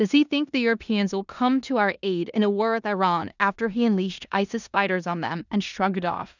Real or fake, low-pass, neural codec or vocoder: fake; 7.2 kHz; codec, 16 kHz in and 24 kHz out, 0.9 kbps, LongCat-Audio-Codec, four codebook decoder